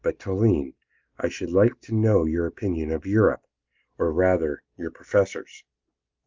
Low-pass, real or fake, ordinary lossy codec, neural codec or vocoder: 7.2 kHz; real; Opus, 32 kbps; none